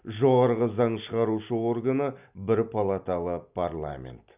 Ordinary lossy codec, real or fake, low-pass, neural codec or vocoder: none; real; 3.6 kHz; none